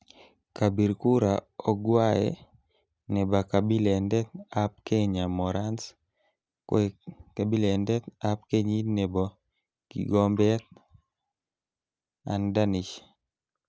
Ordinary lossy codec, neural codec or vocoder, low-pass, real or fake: none; none; none; real